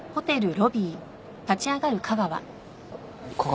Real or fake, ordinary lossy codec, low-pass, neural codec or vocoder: real; none; none; none